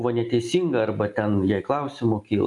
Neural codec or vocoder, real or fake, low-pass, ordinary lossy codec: none; real; 10.8 kHz; AAC, 64 kbps